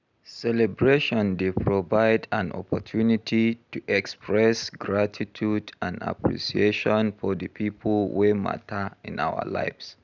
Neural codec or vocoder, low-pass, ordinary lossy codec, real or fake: none; 7.2 kHz; none; real